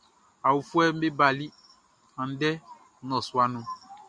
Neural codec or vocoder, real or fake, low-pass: none; real; 9.9 kHz